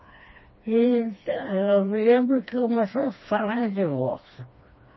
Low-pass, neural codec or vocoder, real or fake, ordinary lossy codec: 7.2 kHz; codec, 16 kHz, 2 kbps, FreqCodec, smaller model; fake; MP3, 24 kbps